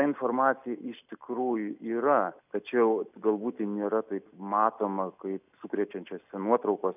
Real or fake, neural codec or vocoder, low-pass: real; none; 3.6 kHz